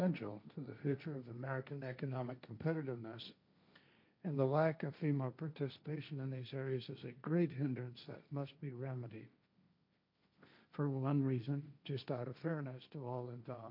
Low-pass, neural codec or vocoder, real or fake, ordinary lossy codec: 5.4 kHz; codec, 16 kHz, 1.1 kbps, Voila-Tokenizer; fake; MP3, 48 kbps